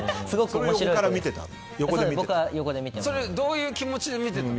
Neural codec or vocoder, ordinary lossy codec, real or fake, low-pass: none; none; real; none